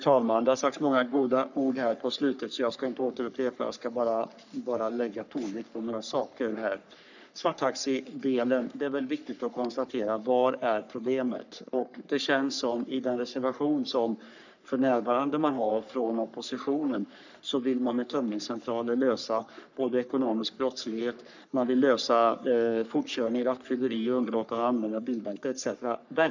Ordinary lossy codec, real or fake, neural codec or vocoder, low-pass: none; fake; codec, 44.1 kHz, 3.4 kbps, Pupu-Codec; 7.2 kHz